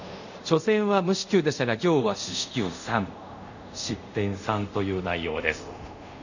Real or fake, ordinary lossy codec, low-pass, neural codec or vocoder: fake; none; 7.2 kHz; codec, 24 kHz, 0.5 kbps, DualCodec